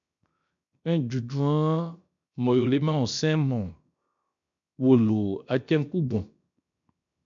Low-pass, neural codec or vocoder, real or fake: 7.2 kHz; codec, 16 kHz, 0.7 kbps, FocalCodec; fake